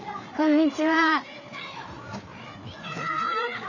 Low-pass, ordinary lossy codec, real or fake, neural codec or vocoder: 7.2 kHz; none; fake; codec, 16 kHz, 4 kbps, FreqCodec, larger model